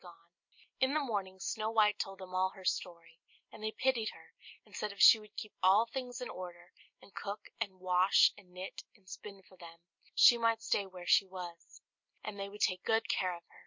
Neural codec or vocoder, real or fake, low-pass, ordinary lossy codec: none; real; 7.2 kHz; MP3, 48 kbps